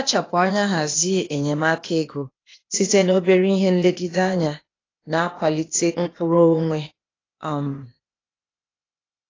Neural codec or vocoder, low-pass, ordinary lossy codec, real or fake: codec, 16 kHz, 0.8 kbps, ZipCodec; 7.2 kHz; AAC, 32 kbps; fake